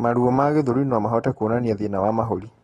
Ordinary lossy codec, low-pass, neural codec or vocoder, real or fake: AAC, 32 kbps; 19.8 kHz; none; real